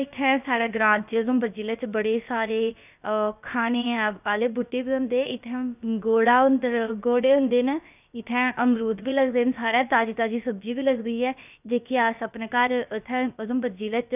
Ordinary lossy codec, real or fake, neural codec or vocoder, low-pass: none; fake; codec, 16 kHz, about 1 kbps, DyCAST, with the encoder's durations; 3.6 kHz